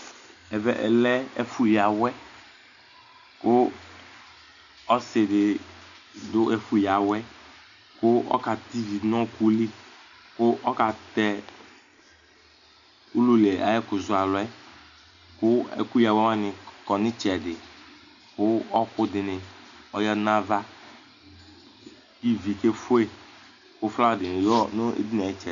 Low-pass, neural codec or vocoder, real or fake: 7.2 kHz; none; real